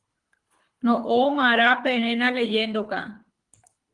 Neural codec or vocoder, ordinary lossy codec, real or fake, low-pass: codec, 24 kHz, 3 kbps, HILCodec; Opus, 24 kbps; fake; 10.8 kHz